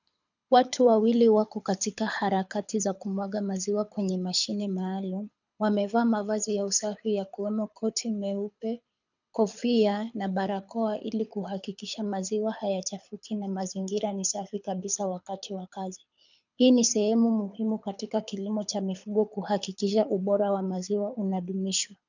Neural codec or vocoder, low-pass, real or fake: codec, 24 kHz, 6 kbps, HILCodec; 7.2 kHz; fake